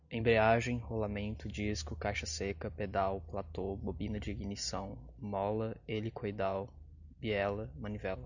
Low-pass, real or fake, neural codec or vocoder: 7.2 kHz; real; none